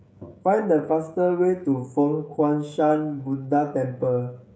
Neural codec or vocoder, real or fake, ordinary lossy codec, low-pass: codec, 16 kHz, 16 kbps, FreqCodec, smaller model; fake; none; none